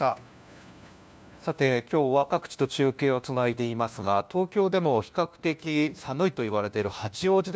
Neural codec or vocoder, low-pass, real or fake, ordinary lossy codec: codec, 16 kHz, 1 kbps, FunCodec, trained on LibriTTS, 50 frames a second; none; fake; none